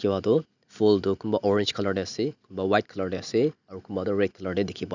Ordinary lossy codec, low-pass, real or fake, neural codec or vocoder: none; 7.2 kHz; real; none